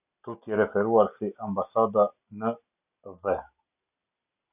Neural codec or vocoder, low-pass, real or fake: none; 3.6 kHz; real